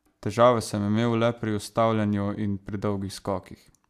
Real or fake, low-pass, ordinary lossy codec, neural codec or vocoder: real; 14.4 kHz; AAC, 96 kbps; none